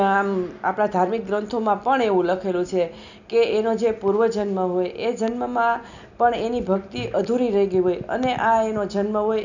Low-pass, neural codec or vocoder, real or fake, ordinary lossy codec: 7.2 kHz; none; real; none